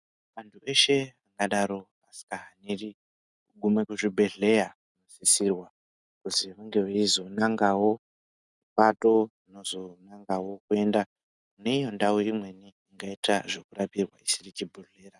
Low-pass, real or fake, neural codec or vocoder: 10.8 kHz; real; none